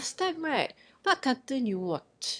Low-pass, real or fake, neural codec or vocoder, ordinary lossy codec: 9.9 kHz; fake; autoencoder, 22.05 kHz, a latent of 192 numbers a frame, VITS, trained on one speaker; none